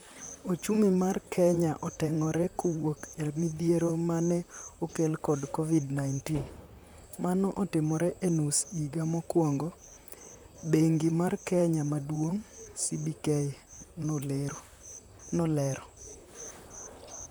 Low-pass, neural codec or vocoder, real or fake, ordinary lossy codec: none; vocoder, 44.1 kHz, 128 mel bands, Pupu-Vocoder; fake; none